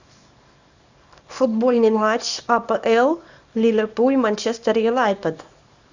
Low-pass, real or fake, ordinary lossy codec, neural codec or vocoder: 7.2 kHz; fake; Opus, 64 kbps; codec, 24 kHz, 0.9 kbps, WavTokenizer, small release